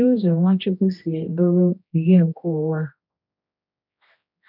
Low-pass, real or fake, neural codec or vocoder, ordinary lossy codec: 5.4 kHz; fake; codec, 16 kHz, 1 kbps, X-Codec, HuBERT features, trained on general audio; none